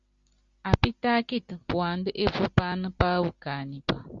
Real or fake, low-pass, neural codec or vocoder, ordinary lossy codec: real; 7.2 kHz; none; Opus, 64 kbps